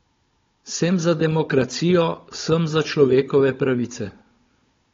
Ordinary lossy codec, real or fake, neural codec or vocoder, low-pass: AAC, 32 kbps; fake; codec, 16 kHz, 16 kbps, FunCodec, trained on Chinese and English, 50 frames a second; 7.2 kHz